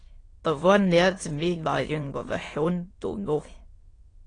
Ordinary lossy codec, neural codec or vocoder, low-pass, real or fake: AAC, 32 kbps; autoencoder, 22.05 kHz, a latent of 192 numbers a frame, VITS, trained on many speakers; 9.9 kHz; fake